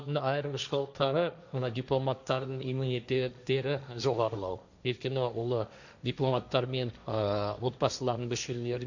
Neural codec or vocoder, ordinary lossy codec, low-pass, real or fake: codec, 16 kHz, 1.1 kbps, Voila-Tokenizer; none; 7.2 kHz; fake